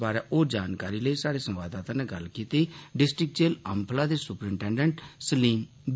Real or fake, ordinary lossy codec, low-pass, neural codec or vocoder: real; none; none; none